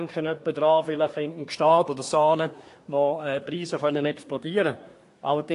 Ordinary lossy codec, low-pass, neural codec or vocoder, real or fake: AAC, 48 kbps; 10.8 kHz; codec, 24 kHz, 1 kbps, SNAC; fake